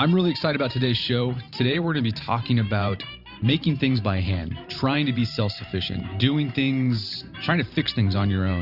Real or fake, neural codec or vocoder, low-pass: fake; vocoder, 44.1 kHz, 128 mel bands every 512 samples, BigVGAN v2; 5.4 kHz